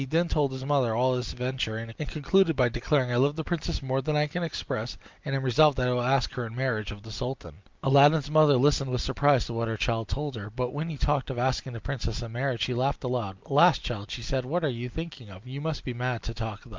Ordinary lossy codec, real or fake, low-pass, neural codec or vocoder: Opus, 24 kbps; real; 7.2 kHz; none